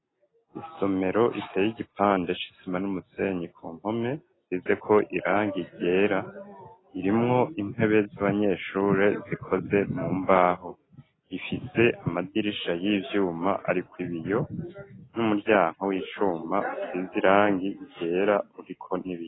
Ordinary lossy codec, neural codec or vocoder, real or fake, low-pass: AAC, 16 kbps; none; real; 7.2 kHz